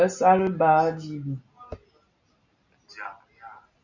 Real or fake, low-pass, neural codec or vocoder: real; 7.2 kHz; none